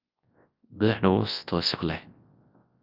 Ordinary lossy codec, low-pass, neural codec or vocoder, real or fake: Opus, 32 kbps; 5.4 kHz; codec, 24 kHz, 0.9 kbps, WavTokenizer, large speech release; fake